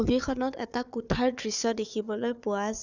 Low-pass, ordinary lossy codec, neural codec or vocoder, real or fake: 7.2 kHz; none; codec, 16 kHz, 4 kbps, FunCodec, trained on Chinese and English, 50 frames a second; fake